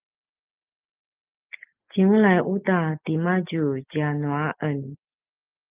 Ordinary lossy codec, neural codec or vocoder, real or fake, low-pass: Opus, 24 kbps; none; real; 3.6 kHz